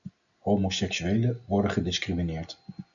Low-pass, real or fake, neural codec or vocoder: 7.2 kHz; real; none